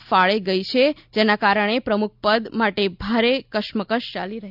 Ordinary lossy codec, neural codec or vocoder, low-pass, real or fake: none; none; 5.4 kHz; real